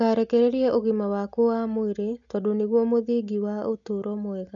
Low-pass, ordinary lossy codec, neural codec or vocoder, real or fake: 7.2 kHz; none; none; real